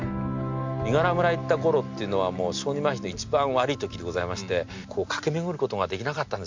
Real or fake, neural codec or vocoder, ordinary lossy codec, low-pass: real; none; MP3, 48 kbps; 7.2 kHz